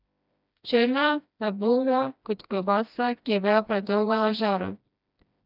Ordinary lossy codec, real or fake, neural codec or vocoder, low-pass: none; fake; codec, 16 kHz, 1 kbps, FreqCodec, smaller model; 5.4 kHz